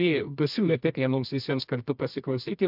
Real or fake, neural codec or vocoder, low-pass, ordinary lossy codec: fake; codec, 24 kHz, 0.9 kbps, WavTokenizer, medium music audio release; 5.4 kHz; MP3, 48 kbps